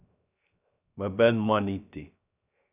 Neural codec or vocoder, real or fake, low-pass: codec, 16 kHz, 0.3 kbps, FocalCodec; fake; 3.6 kHz